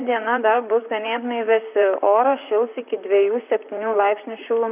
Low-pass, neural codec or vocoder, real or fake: 3.6 kHz; vocoder, 44.1 kHz, 128 mel bands, Pupu-Vocoder; fake